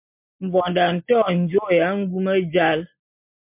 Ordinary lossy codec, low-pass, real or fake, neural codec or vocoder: MP3, 32 kbps; 3.6 kHz; real; none